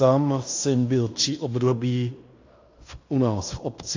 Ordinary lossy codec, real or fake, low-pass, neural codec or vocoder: AAC, 48 kbps; fake; 7.2 kHz; codec, 16 kHz in and 24 kHz out, 0.9 kbps, LongCat-Audio-Codec, fine tuned four codebook decoder